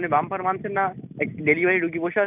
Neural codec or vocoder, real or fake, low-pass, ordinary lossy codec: none; real; 3.6 kHz; none